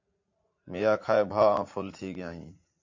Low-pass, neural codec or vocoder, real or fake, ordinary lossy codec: 7.2 kHz; vocoder, 44.1 kHz, 80 mel bands, Vocos; fake; MP3, 48 kbps